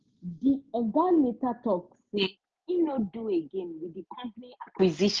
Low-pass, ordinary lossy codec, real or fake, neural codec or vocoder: 7.2 kHz; Opus, 24 kbps; real; none